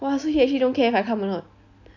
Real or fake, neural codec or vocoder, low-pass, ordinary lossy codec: real; none; 7.2 kHz; none